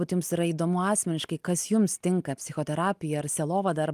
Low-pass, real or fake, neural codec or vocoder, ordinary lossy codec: 14.4 kHz; real; none; Opus, 32 kbps